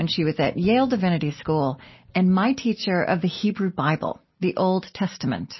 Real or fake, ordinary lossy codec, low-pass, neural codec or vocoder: real; MP3, 24 kbps; 7.2 kHz; none